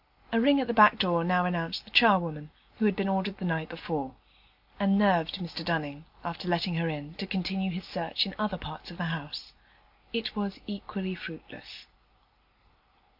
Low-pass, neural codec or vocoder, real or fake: 5.4 kHz; none; real